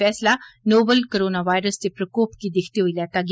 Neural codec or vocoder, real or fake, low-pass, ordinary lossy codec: none; real; none; none